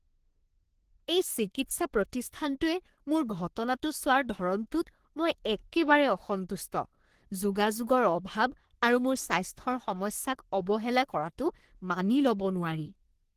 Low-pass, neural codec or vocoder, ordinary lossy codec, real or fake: 14.4 kHz; codec, 44.1 kHz, 3.4 kbps, Pupu-Codec; Opus, 16 kbps; fake